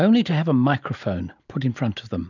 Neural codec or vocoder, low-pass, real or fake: none; 7.2 kHz; real